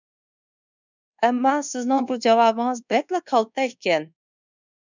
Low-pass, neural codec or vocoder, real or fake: 7.2 kHz; codec, 24 kHz, 1.2 kbps, DualCodec; fake